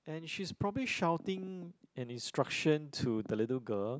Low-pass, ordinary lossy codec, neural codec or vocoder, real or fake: none; none; none; real